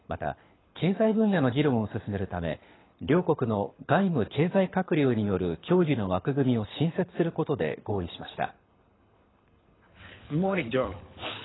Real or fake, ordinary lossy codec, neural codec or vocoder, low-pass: fake; AAC, 16 kbps; codec, 24 kHz, 6 kbps, HILCodec; 7.2 kHz